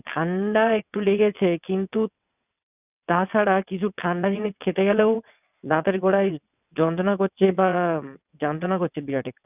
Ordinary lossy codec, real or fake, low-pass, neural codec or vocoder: none; fake; 3.6 kHz; vocoder, 22.05 kHz, 80 mel bands, WaveNeXt